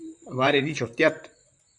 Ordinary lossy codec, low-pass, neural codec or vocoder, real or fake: MP3, 96 kbps; 10.8 kHz; vocoder, 44.1 kHz, 128 mel bands, Pupu-Vocoder; fake